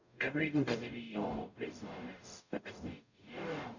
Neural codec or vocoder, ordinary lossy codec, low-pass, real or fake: codec, 44.1 kHz, 0.9 kbps, DAC; none; 7.2 kHz; fake